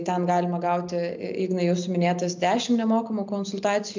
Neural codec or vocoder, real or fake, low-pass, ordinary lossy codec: none; real; 7.2 kHz; MP3, 64 kbps